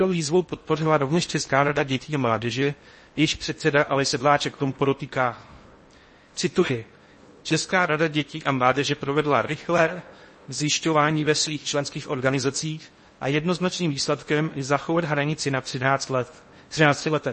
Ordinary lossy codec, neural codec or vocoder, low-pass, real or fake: MP3, 32 kbps; codec, 16 kHz in and 24 kHz out, 0.6 kbps, FocalCodec, streaming, 4096 codes; 10.8 kHz; fake